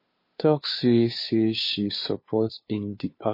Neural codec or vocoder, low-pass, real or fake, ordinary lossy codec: codec, 16 kHz, 2 kbps, FunCodec, trained on Chinese and English, 25 frames a second; 5.4 kHz; fake; MP3, 24 kbps